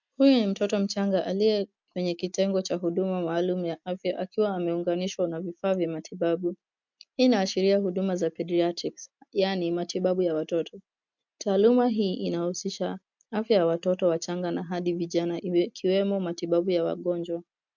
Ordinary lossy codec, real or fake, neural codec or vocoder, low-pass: MP3, 64 kbps; real; none; 7.2 kHz